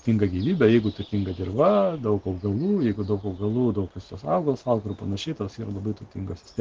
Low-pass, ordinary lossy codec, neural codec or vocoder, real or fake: 7.2 kHz; Opus, 16 kbps; none; real